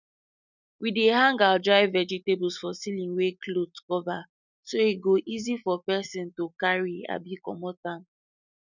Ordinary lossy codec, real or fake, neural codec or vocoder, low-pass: none; real; none; 7.2 kHz